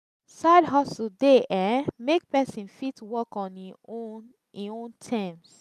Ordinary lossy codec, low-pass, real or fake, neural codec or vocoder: none; 14.4 kHz; real; none